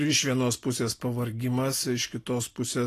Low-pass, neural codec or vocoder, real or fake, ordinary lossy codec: 14.4 kHz; none; real; AAC, 48 kbps